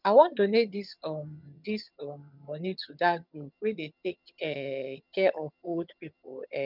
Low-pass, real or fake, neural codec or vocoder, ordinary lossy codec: 5.4 kHz; fake; vocoder, 22.05 kHz, 80 mel bands, HiFi-GAN; none